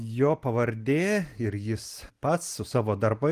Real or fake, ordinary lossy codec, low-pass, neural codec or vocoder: real; Opus, 24 kbps; 14.4 kHz; none